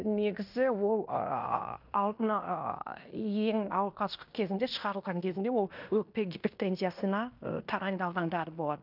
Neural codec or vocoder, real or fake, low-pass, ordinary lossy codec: codec, 16 kHz in and 24 kHz out, 0.9 kbps, LongCat-Audio-Codec, fine tuned four codebook decoder; fake; 5.4 kHz; none